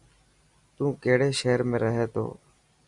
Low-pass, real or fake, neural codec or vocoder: 10.8 kHz; real; none